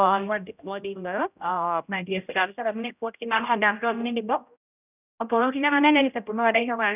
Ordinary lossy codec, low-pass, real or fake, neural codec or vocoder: none; 3.6 kHz; fake; codec, 16 kHz, 0.5 kbps, X-Codec, HuBERT features, trained on general audio